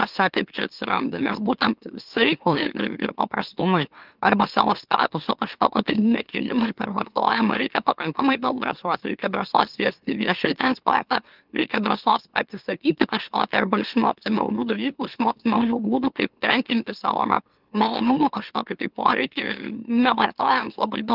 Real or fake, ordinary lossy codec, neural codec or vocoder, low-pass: fake; Opus, 24 kbps; autoencoder, 44.1 kHz, a latent of 192 numbers a frame, MeloTTS; 5.4 kHz